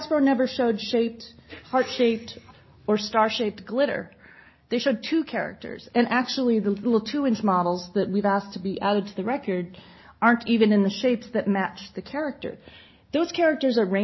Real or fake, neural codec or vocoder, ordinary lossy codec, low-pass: real; none; MP3, 24 kbps; 7.2 kHz